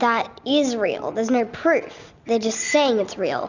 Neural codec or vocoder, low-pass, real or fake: none; 7.2 kHz; real